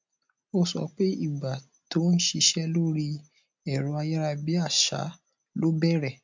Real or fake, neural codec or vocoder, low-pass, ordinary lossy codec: real; none; 7.2 kHz; MP3, 64 kbps